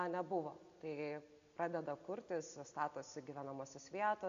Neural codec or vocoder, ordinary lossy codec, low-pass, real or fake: none; AAC, 64 kbps; 7.2 kHz; real